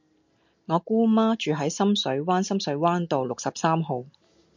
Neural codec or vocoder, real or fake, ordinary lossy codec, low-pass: none; real; MP3, 64 kbps; 7.2 kHz